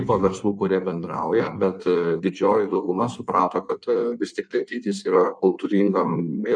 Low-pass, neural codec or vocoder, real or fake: 9.9 kHz; codec, 16 kHz in and 24 kHz out, 1.1 kbps, FireRedTTS-2 codec; fake